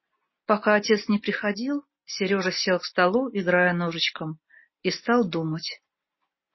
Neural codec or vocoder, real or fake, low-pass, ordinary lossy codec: none; real; 7.2 kHz; MP3, 24 kbps